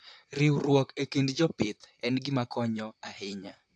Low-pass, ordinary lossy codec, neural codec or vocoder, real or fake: 9.9 kHz; AAC, 64 kbps; vocoder, 44.1 kHz, 128 mel bands, Pupu-Vocoder; fake